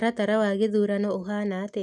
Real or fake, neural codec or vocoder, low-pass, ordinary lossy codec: real; none; none; none